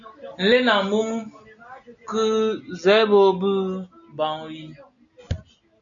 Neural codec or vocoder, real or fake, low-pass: none; real; 7.2 kHz